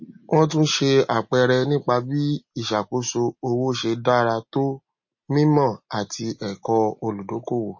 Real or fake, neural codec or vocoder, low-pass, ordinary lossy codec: real; none; 7.2 kHz; MP3, 32 kbps